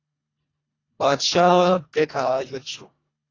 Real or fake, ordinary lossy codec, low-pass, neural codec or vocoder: fake; AAC, 32 kbps; 7.2 kHz; codec, 24 kHz, 1.5 kbps, HILCodec